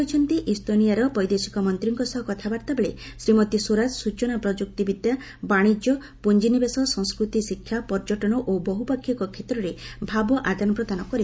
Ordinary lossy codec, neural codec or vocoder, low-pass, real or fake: none; none; none; real